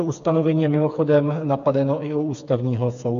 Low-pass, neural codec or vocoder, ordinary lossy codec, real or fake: 7.2 kHz; codec, 16 kHz, 4 kbps, FreqCodec, smaller model; AAC, 64 kbps; fake